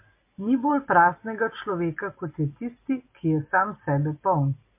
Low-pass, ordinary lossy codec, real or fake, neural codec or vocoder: 3.6 kHz; MP3, 24 kbps; real; none